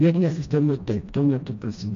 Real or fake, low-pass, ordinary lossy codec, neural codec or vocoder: fake; 7.2 kHz; MP3, 64 kbps; codec, 16 kHz, 1 kbps, FreqCodec, smaller model